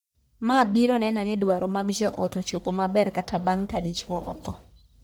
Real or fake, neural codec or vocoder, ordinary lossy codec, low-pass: fake; codec, 44.1 kHz, 1.7 kbps, Pupu-Codec; none; none